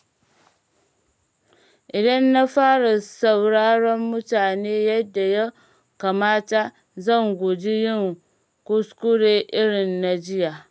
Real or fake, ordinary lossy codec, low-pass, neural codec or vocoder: real; none; none; none